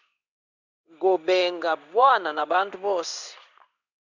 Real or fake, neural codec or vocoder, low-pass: fake; codec, 16 kHz in and 24 kHz out, 1 kbps, XY-Tokenizer; 7.2 kHz